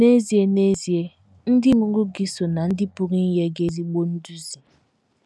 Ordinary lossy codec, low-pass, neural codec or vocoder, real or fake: none; none; none; real